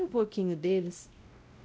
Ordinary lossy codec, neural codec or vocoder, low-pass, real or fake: none; codec, 16 kHz, 0.5 kbps, X-Codec, WavLM features, trained on Multilingual LibriSpeech; none; fake